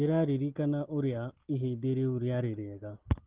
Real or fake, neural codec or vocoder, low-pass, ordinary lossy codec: real; none; 3.6 kHz; Opus, 16 kbps